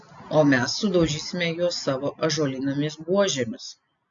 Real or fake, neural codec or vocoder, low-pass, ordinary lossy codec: real; none; 7.2 kHz; AAC, 64 kbps